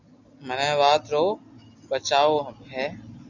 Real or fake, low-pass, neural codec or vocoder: real; 7.2 kHz; none